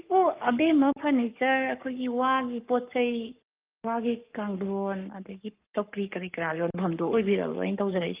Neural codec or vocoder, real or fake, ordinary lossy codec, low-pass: codec, 44.1 kHz, 7.8 kbps, Pupu-Codec; fake; Opus, 24 kbps; 3.6 kHz